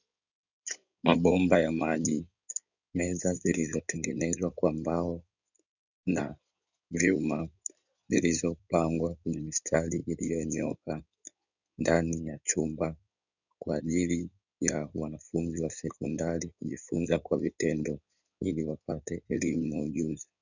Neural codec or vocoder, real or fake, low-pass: codec, 16 kHz in and 24 kHz out, 2.2 kbps, FireRedTTS-2 codec; fake; 7.2 kHz